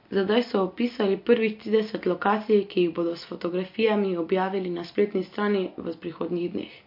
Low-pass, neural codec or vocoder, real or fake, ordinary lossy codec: 5.4 kHz; none; real; MP3, 32 kbps